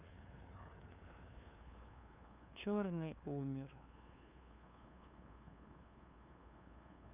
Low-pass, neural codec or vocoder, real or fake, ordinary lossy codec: 3.6 kHz; codec, 16 kHz, 2 kbps, FunCodec, trained on LibriTTS, 25 frames a second; fake; none